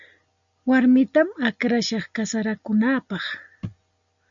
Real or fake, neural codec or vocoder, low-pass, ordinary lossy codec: real; none; 7.2 kHz; MP3, 96 kbps